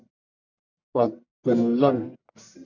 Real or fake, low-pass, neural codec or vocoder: fake; 7.2 kHz; codec, 44.1 kHz, 1.7 kbps, Pupu-Codec